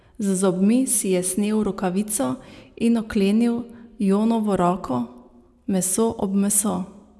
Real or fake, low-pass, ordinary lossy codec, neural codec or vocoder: real; none; none; none